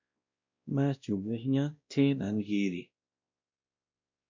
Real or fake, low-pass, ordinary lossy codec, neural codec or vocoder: fake; 7.2 kHz; MP3, 64 kbps; codec, 16 kHz, 1 kbps, X-Codec, WavLM features, trained on Multilingual LibriSpeech